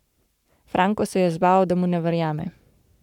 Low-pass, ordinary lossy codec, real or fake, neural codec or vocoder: 19.8 kHz; none; fake; codec, 44.1 kHz, 7.8 kbps, Pupu-Codec